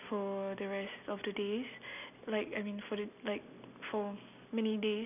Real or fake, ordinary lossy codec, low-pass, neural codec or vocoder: real; none; 3.6 kHz; none